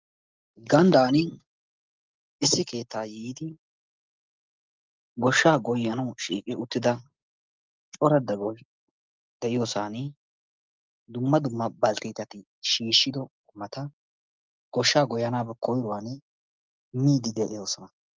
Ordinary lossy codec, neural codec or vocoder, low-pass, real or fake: Opus, 24 kbps; none; 7.2 kHz; real